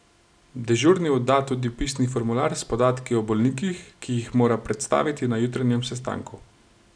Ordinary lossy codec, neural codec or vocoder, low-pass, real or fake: none; none; 9.9 kHz; real